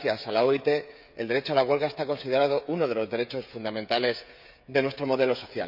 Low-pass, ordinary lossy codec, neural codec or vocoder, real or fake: 5.4 kHz; none; codec, 16 kHz, 16 kbps, FreqCodec, smaller model; fake